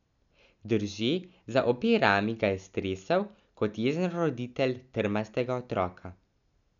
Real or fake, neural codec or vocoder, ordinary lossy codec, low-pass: real; none; none; 7.2 kHz